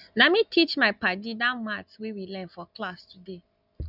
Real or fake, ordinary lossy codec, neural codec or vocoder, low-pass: real; none; none; 5.4 kHz